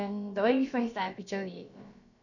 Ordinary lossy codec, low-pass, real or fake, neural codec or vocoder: none; 7.2 kHz; fake; codec, 16 kHz, about 1 kbps, DyCAST, with the encoder's durations